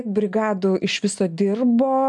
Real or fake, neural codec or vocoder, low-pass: real; none; 10.8 kHz